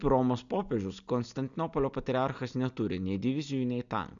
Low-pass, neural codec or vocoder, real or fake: 7.2 kHz; none; real